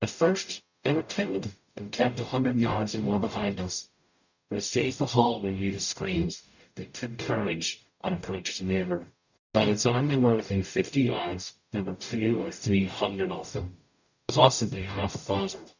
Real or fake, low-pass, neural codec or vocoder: fake; 7.2 kHz; codec, 44.1 kHz, 0.9 kbps, DAC